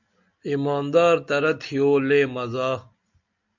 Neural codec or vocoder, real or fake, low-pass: none; real; 7.2 kHz